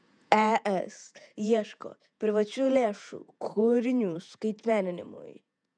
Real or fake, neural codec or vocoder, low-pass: fake; vocoder, 48 kHz, 128 mel bands, Vocos; 9.9 kHz